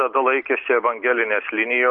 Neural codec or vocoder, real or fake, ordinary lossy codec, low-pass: none; real; MP3, 32 kbps; 10.8 kHz